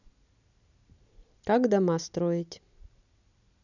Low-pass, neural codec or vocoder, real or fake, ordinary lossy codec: 7.2 kHz; none; real; none